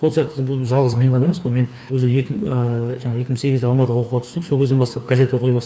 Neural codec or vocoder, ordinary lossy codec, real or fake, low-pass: codec, 16 kHz, 2 kbps, FreqCodec, larger model; none; fake; none